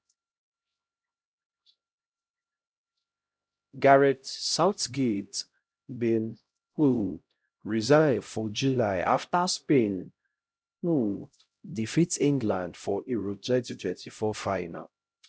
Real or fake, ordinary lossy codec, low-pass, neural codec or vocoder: fake; none; none; codec, 16 kHz, 0.5 kbps, X-Codec, HuBERT features, trained on LibriSpeech